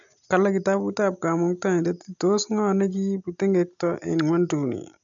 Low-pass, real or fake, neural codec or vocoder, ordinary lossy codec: 7.2 kHz; real; none; none